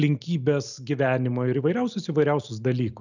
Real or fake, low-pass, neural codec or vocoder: real; 7.2 kHz; none